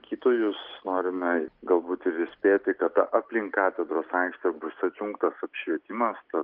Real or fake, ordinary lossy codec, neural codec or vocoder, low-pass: real; Opus, 32 kbps; none; 5.4 kHz